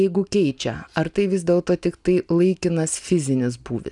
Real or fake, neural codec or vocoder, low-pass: real; none; 10.8 kHz